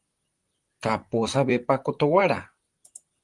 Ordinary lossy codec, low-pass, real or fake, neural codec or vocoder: Opus, 32 kbps; 10.8 kHz; fake; vocoder, 44.1 kHz, 128 mel bands, Pupu-Vocoder